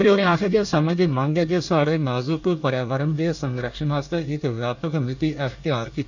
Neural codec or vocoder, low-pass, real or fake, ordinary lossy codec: codec, 24 kHz, 1 kbps, SNAC; 7.2 kHz; fake; none